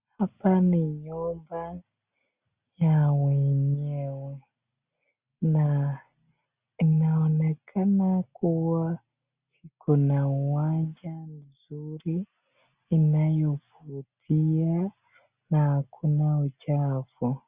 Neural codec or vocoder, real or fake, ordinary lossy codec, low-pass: none; real; Opus, 64 kbps; 3.6 kHz